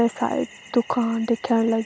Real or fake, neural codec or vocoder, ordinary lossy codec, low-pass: real; none; none; none